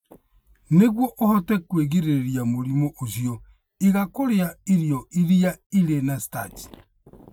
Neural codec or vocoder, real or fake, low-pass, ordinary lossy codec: none; real; none; none